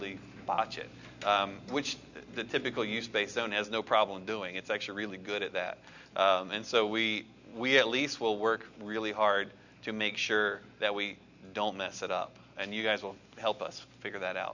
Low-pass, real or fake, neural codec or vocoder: 7.2 kHz; real; none